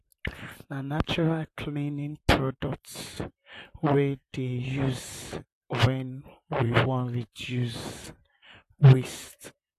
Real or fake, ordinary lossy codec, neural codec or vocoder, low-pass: fake; AAC, 64 kbps; vocoder, 44.1 kHz, 128 mel bands, Pupu-Vocoder; 14.4 kHz